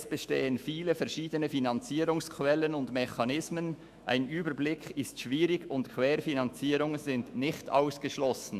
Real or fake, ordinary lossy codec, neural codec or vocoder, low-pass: fake; AAC, 96 kbps; autoencoder, 48 kHz, 128 numbers a frame, DAC-VAE, trained on Japanese speech; 14.4 kHz